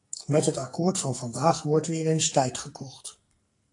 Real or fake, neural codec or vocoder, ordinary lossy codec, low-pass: fake; codec, 44.1 kHz, 2.6 kbps, SNAC; AAC, 48 kbps; 10.8 kHz